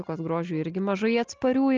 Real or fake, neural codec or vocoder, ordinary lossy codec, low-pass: real; none; Opus, 32 kbps; 7.2 kHz